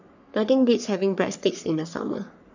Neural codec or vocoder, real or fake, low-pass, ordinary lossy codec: codec, 44.1 kHz, 3.4 kbps, Pupu-Codec; fake; 7.2 kHz; none